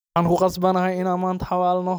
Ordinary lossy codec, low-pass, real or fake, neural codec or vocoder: none; none; real; none